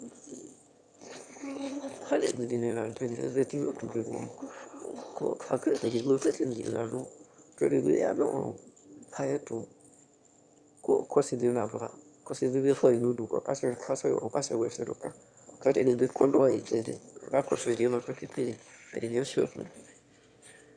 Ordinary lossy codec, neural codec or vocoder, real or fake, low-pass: Opus, 64 kbps; autoencoder, 22.05 kHz, a latent of 192 numbers a frame, VITS, trained on one speaker; fake; 9.9 kHz